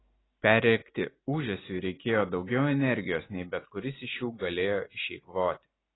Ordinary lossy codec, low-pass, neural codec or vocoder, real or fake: AAC, 16 kbps; 7.2 kHz; none; real